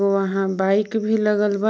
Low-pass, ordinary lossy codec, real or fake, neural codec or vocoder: none; none; real; none